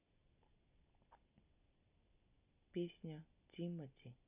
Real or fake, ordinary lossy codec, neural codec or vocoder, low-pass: real; none; none; 3.6 kHz